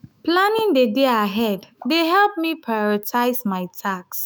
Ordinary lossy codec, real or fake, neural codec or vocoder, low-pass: none; fake; autoencoder, 48 kHz, 128 numbers a frame, DAC-VAE, trained on Japanese speech; none